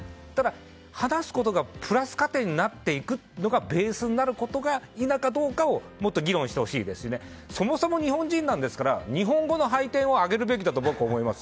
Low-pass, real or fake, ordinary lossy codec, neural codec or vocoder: none; real; none; none